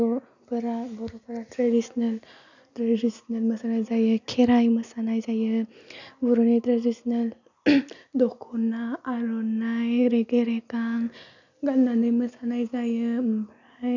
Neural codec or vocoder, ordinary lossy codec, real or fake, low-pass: none; none; real; 7.2 kHz